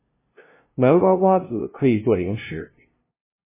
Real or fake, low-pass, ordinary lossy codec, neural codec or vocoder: fake; 3.6 kHz; MP3, 16 kbps; codec, 16 kHz, 0.5 kbps, FunCodec, trained on LibriTTS, 25 frames a second